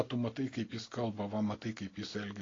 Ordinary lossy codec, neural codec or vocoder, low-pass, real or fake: AAC, 32 kbps; none; 7.2 kHz; real